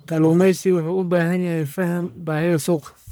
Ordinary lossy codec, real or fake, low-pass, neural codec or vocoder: none; fake; none; codec, 44.1 kHz, 1.7 kbps, Pupu-Codec